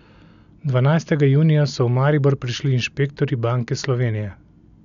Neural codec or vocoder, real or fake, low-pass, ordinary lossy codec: none; real; 7.2 kHz; none